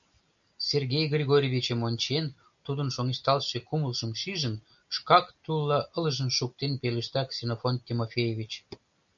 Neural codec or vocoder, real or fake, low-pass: none; real; 7.2 kHz